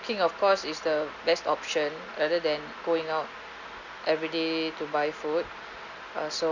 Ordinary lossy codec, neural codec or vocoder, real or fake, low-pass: none; none; real; 7.2 kHz